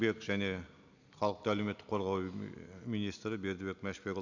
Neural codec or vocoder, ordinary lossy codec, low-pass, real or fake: none; none; 7.2 kHz; real